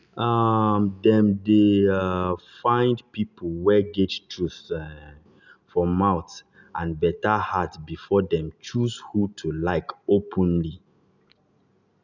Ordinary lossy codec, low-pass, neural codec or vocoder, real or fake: none; 7.2 kHz; none; real